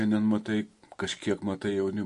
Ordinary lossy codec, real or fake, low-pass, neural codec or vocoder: MP3, 48 kbps; real; 10.8 kHz; none